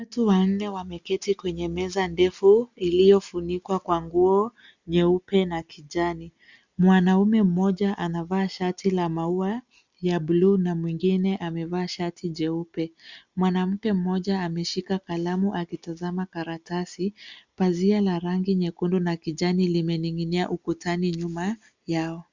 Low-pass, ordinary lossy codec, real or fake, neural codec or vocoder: 7.2 kHz; Opus, 64 kbps; real; none